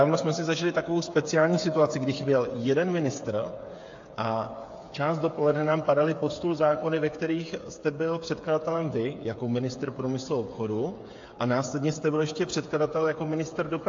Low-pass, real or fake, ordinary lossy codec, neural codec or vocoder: 7.2 kHz; fake; AAC, 48 kbps; codec, 16 kHz, 8 kbps, FreqCodec, smaller model